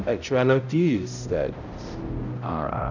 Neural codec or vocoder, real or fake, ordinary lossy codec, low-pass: codec, 16 kHz, 0.5 kbps, X-Codec, HuBERT features, trained on balanced general audio; fake; none; 7.2 kHz